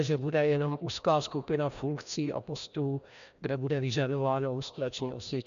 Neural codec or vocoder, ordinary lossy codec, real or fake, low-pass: codec, 16 kHz, 1 kbps, FreqCodec, larger model; MP3, 96 kbps; fake; 7.2 kHz